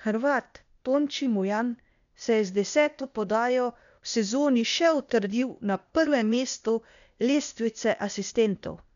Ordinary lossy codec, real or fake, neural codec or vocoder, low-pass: none; fake; codec, 16 kHz, 0.8 kbps, ZipCodec; 7.2 kHz